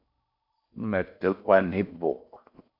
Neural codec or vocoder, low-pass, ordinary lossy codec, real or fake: codec, 16 kHz in and 24 kHz out, 0.6 kbps, FocalCodec, streaming, 4096 codes; 5.4 kHz; MP3, 48 kbps; fake